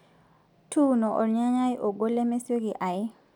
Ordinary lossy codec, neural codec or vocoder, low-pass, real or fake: none; none; 19.8 kHz; real